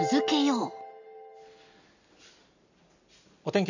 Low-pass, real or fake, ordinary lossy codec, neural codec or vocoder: 7.2 kHz; real; none; none